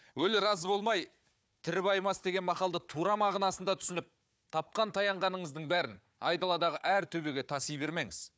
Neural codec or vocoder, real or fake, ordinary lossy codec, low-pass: codec, 16 kHz, 4 kbps, FunCodec, trained on Chinese and English, 50 frames a second; fake; none; none